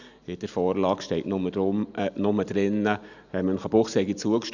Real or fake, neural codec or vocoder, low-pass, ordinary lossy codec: real; none; 7.2 kHz; none